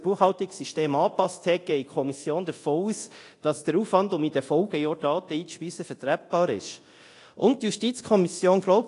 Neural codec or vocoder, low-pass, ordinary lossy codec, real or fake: codec, 24 kHz, 0.9 kbps, DualCodec; 10.8 kHz; AAC, 48 kbps; fake